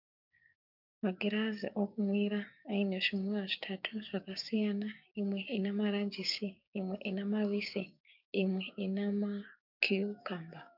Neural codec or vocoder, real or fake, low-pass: codec, 16 kHz, 6 kbps, DAC; fake; 5.4 kHz